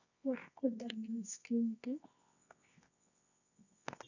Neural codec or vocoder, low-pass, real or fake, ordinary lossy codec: codec, 24 kHz, 0.9 kbps, WavTokenizer, medium music audio release; 7.2 kHz; fake; none